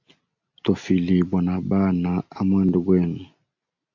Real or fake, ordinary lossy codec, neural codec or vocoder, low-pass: real; AAC, 48 kbps; none; 7.2 kHz